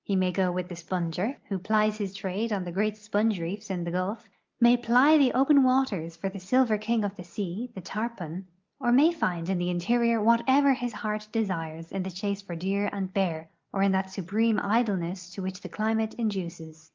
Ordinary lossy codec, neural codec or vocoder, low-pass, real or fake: Opus, 24 kbps; none; 7.2 kHz; real